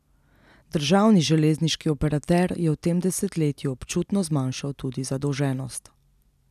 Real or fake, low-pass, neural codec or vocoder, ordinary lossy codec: real; 14.4 kHz; none; none